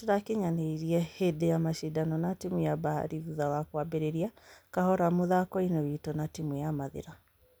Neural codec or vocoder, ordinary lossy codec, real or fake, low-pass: none; none; real; none